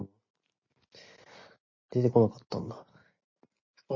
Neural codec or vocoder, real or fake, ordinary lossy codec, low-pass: none; real; MP3, 32 kbps; 7.2 kHz